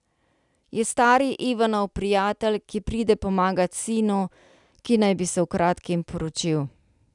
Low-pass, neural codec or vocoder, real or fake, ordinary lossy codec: 10.8 kHz; none; real; none